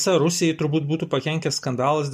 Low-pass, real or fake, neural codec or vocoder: 14.4 kHz; real; none